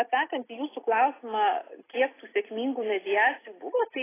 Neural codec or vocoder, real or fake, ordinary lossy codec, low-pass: none; real; AAC, 16 kbps; 3.6 kHz